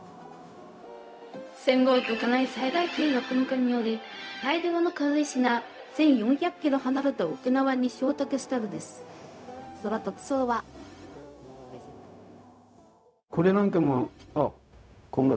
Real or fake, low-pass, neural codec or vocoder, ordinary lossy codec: fake; none; codec, 16 kHz, 0.4 kbps, LongCat-Audio-Codec; none